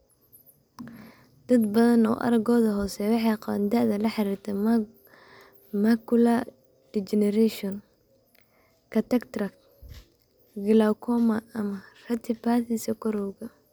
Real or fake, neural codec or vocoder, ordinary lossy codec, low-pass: real; none; none; none